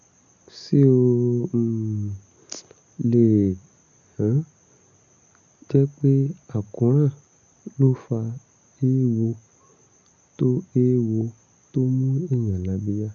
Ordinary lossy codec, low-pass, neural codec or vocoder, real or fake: none; 7.2 kHz; none; real